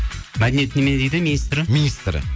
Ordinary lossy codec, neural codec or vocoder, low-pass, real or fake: none; none; none; real